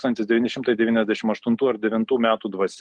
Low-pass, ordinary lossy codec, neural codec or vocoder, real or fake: 9.9 kHz; Opus, 32 kbps; none; real